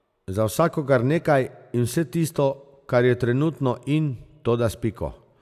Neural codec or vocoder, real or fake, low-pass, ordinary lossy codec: none; real; 14.4 kHz; AAC, 96 kbps